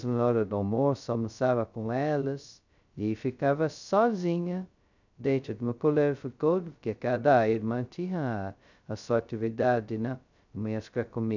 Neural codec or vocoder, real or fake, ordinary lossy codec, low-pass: codec, 16 kHz, 0.2 kbps, FocalCodec; fake; none; 7.2 kHz